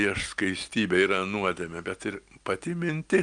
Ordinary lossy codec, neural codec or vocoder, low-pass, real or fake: Opus, 32 kbps; none; 9.9 kHz; real